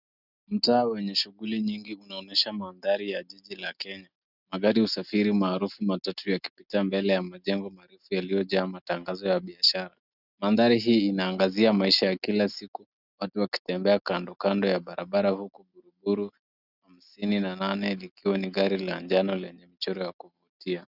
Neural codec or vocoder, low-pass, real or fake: none; 5.4 kHz; real